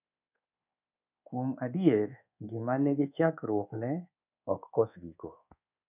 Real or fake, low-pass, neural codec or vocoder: fake; 3.6 kHz; codec, 24 kHz, 1.2 kbps, DualCodec